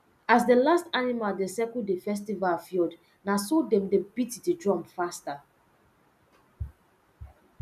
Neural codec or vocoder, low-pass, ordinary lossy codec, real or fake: none; 14.4 kHz; none; real